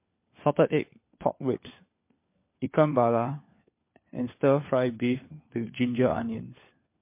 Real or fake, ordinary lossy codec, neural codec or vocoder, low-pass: fake; MP3, 24 kbps; codec, 16 kHz, 4 kbps, FunCodec, trained on LibriTTS, 50 frames a second; 3.6 kHz